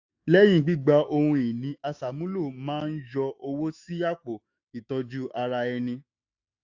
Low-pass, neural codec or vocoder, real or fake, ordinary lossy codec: 7.2 kHz; autoencoder, 48 kHz, 128 numbers a frame, DAC-VAE, trained on Japanese speech; fake; AAC, 48 kbps